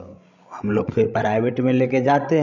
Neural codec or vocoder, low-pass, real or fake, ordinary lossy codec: codec, 16 kHz, 16 kbps, FreqCodec, larger model; 7.2 kHz; fake; none